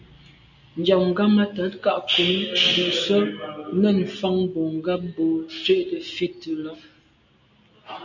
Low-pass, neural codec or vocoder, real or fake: 7.2 kHz; none; real